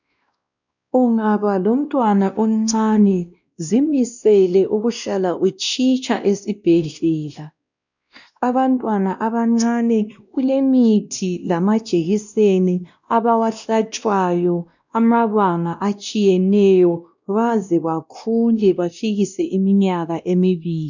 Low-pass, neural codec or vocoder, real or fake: 7.2 kHz; codec, 16 kHz, 1 kbps, X-Codec, WavLM features, trained on Multilingual LibriSpeech; fake